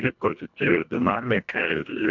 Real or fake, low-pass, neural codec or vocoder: fake; 7.2 kHz; codec, 24 kHz, 1.5 kbps, HILCodec